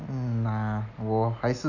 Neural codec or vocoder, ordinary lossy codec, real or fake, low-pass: none; none; real; 7.2 kHz